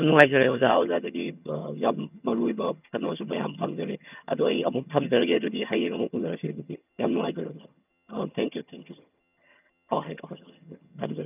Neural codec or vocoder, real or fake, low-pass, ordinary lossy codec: vocoder, 22.05 kHz, 80 mel bands, HiFi-GAN; fake; 3.6 kHz; none